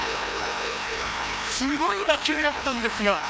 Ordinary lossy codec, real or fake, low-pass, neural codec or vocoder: none; fake; none; codec, 16 kHz, 1 kbps, FreqCodec, larger model